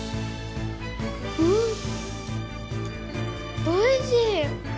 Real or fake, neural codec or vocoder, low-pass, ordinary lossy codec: real; none; none; none